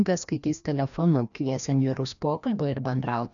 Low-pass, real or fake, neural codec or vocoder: 7.2 kHz; fake; codec, 16 kHz, 1 kbps, FreqCodec, larger model